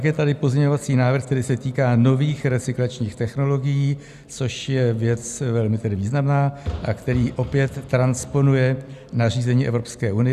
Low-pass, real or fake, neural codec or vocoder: 14.4 kHz; real; none